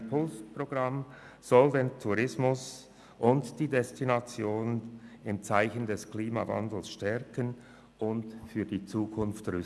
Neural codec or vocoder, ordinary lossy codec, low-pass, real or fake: none; none; none; real